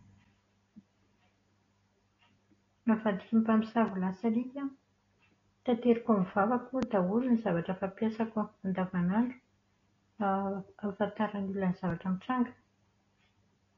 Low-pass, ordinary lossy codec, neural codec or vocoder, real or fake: 7.2 kHz; AAC, 32 kbps; none; real